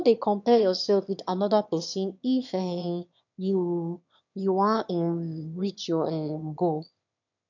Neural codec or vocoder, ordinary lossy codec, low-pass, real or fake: autoencoder, 22.05 kHz, a latent of 192 numbers a frame, VITS, trained on one speaker; none; 7.2 kHz; fake